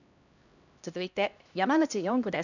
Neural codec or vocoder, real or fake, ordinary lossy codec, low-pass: codec, 16 kHz, 1 kbps, X-Codec, HuBERT features, trained on LibriSpeech; fake; none; 7.2 kHz